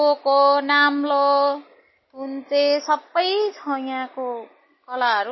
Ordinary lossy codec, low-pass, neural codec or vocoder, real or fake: MP3, 24 kbps; 7.2 kHz; none; real